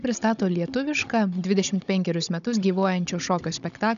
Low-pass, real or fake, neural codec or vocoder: 7.2 kHz; fake; codec, 16 kHz, 16 kbps, FunCodec, trained on Chinese and English, 50 frames a second